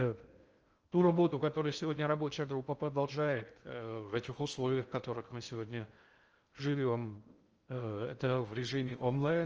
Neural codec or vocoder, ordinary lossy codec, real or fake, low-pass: codec, 16 kHz in and 24 kHz out, 0.6 kbps, FocalCodec, streaming, 2048 codes; Opus, 24 kbps; fake; 7.2 kHz